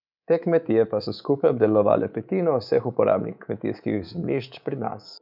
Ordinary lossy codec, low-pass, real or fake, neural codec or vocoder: none; 5.4 kHz; fake; codec, 24 kHz, 3.1 kbps, DualCodec